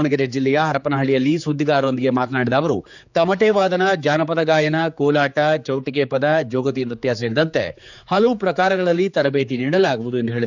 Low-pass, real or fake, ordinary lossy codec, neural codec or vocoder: 7.2 kHz; fake; none; codec, 16 kHz, 4 kbps, X-Codec, HuBERT features, trained on general audio